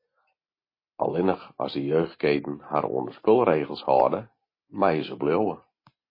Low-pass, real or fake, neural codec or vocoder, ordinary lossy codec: 5.4 kHz; real; none; MP3, 24 kbps